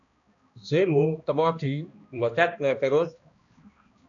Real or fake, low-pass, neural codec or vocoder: fake; 7.2 kHz; codec, 16 kHz, 1 kbps, X-Codec, HuBERT features, trained on balanced general audio